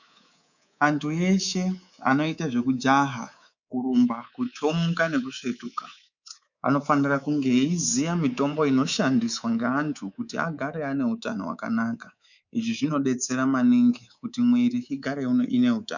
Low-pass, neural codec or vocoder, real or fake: 7.2 kHz; codec, 24 kHz, 3.1 kbps, DualCodec; fake